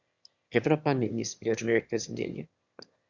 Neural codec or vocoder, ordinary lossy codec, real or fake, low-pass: autoencoder, 22.05 kHz, a latent of 192 numbers a frame, VITS, trained on one speaker; Opus, 64 kbps; fake; 7.2 kHz